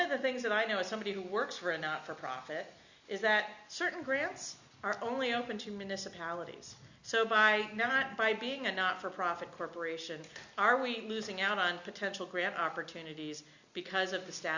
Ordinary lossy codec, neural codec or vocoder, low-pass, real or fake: Opus, 64 kbps; none; 7.2 kHz; real